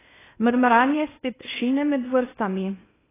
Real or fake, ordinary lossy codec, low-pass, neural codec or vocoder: fake; AAC, 16 kbps; 3.6 kHz; codec, 16 kHz in and 24 kHz out, 0.6 kbps, FocalCodec, streaming, 2048 codes